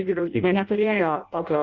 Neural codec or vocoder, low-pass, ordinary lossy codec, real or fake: codec, 16 kHz in and 24 kHz out, 0.6 kbps, FireRedTTS-2 codec; 7.2 kHz; Opus, 64 kbps; fake